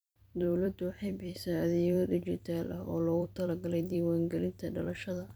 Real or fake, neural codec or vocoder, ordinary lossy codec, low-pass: fake; vocoder, 44.1 kHz, 128 mel bands every 256 samples, BigVGAN v2; none; none